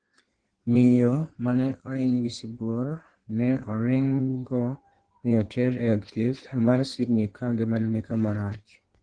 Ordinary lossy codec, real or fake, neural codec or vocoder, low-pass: Opus, 16 kbps; fake; codec, 16 kHz in and 24 kHz out, 1.1 kbps, FireRedTTS-2 codec; 9.9 kHz